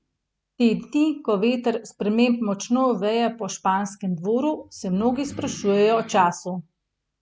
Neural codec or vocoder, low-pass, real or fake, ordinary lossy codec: none; none; real; none